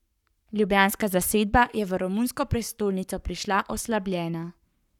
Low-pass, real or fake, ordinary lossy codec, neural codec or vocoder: 19.8 kHz; fake; none; codec, 44.1 kHz, 7.8 kbps, Pupu-Codec